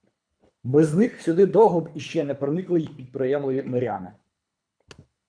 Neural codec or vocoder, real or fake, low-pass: codec, 24 kHz, 3 kbps, HILCodec; fake; 9.9 kHz